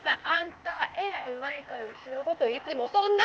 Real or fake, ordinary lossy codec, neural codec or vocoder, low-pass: fake; none; codec, 16 kHz, 0.8 kbps, ZipCodec; none